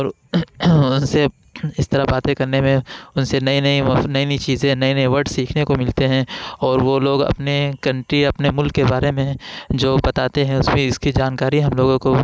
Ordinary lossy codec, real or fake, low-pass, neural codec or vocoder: none; real; none; none